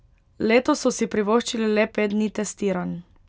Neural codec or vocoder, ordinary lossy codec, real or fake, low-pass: none; none; real; none